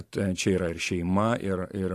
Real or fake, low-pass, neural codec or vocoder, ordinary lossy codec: fake; 14.4 kHz; vocoder, 44.1 kHz, 128 mel bands every 512 samples, BigVGAN v2; AAC, 64 kbps